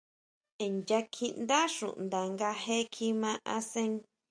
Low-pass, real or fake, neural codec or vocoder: 9.9 kHz; real; none